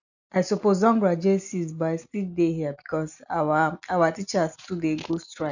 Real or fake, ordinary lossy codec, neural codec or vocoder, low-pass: real; none; none; 7.2 kHz